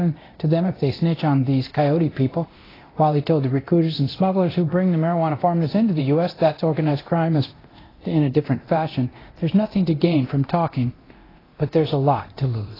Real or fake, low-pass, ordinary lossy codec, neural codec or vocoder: fake; 5.4 kHz; AAC, 24 kbps; codec, 24 kHz, 0.9 kbps, DualCodec